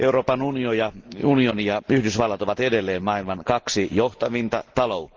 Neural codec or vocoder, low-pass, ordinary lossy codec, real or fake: none; 7.2 kHz; Opus, 16 kbps; real